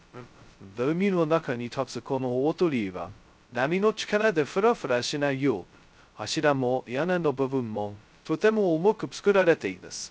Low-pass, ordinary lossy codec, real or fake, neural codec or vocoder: none; none; fake; codec, 16 kHz, 0.2 kbps, FocalCodec